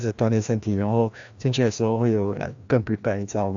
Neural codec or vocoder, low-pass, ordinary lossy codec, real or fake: codec, 16 kHz, 1 kbps, FreqCodec, larger model; 7.2 kHz; AAC, 64 kbps; fake